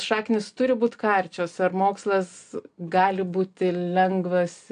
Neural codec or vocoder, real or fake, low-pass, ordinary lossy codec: none; real; 9.9 kHz; AAC, 64 kbps